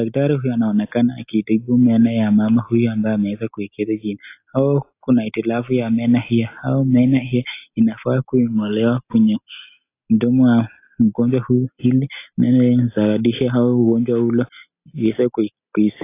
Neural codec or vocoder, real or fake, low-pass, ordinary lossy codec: none; real; 3.6 kHz; AAC, 24 kbps